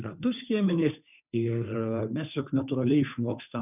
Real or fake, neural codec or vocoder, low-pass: fake; codec, 16 kHz, 2 kbps, FunCodec, trained on Chinese and English, 25 frames a second; 3.6 kHz